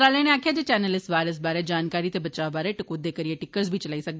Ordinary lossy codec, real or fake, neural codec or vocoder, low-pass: none; real; none; none